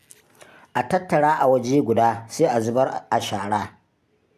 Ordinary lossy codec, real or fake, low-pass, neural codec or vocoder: AAC, 96 kbps; real; 14.4 kHz; none